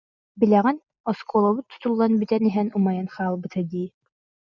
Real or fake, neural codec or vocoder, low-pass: real; none; 7.2 kHz